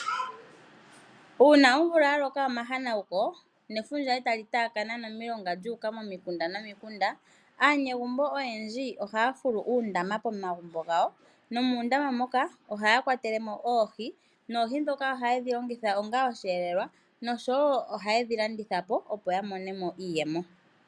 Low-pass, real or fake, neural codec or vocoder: 9.9 kHz; real; none